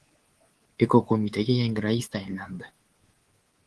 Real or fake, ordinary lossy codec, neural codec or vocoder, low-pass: fake; Opus, 16 kbps; codec, 24 kHz, 3.1 kbps, DualCodec; 10.8 kHz